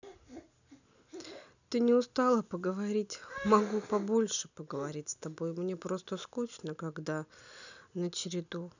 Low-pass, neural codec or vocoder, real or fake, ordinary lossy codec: 7.2 kHz; none; real; none